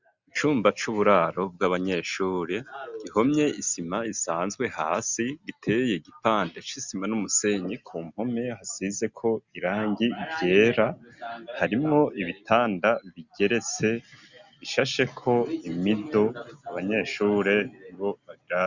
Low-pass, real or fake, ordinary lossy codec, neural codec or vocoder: 7.2 kHz; real; Opus, 64 kbps; none